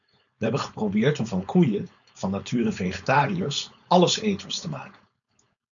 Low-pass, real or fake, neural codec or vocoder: 7.2 kHz; fake; codec, 16 kHz, 4.8 kbps, FACodec